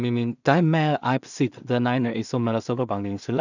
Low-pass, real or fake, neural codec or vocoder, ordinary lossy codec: 7.2 kHz; fake; codec, 16 kHz in and 24 kHz out, 0.4 kbps, LongCat-Audio-Codec, two codebook decoder; none